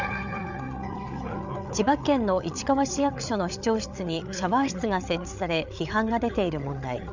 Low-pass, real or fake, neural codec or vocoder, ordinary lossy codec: 7.2 kHz; fake; codec, 16 kHz, 8 kbps, FreqCodec, larger model; none